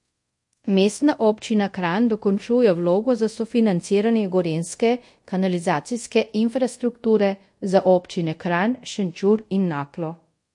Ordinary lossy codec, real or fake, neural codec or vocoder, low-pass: MP3, 48 kbps; fake; codec, 24 kHz, 0.5 kbps, DualCodec; 10.8 kHz